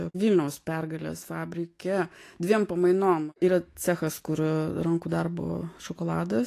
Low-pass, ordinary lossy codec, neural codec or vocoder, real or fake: 14.4 kHz; AAC, 48 kbps; autoencoder, 48 kHz, 128 numbers a frame, DAC-VAE, trained on Japanese speech; fake